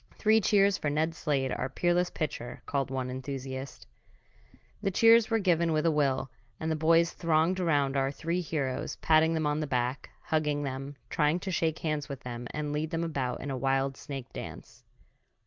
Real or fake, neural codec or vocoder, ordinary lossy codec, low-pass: real; none; Opus, 24 kbps; 7.2 kHz